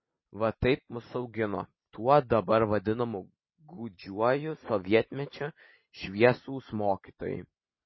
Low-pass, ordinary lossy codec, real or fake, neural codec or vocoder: 7.2 kHz; MP3, 24 kbps; real; none